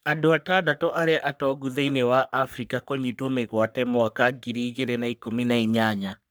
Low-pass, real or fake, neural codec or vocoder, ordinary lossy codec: none; fake; codec, 44.1 kHz, 3.4 kbps, Pupu-Codec; none